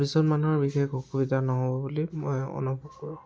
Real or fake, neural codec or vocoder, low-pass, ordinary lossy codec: real; none; none; none